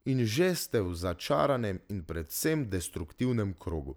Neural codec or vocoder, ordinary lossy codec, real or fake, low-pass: none; none; real; none